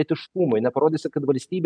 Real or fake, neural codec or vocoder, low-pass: real; none; 9.9 kHz